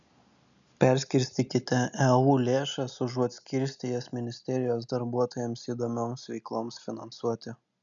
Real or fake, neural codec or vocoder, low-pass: real; none; 7.2 kHz